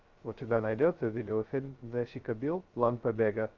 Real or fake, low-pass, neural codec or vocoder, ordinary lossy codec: fake; 7.2 kHz; codec, 16 kHz, 0.2 kbps, FocalCodec; Opus, 32 kbps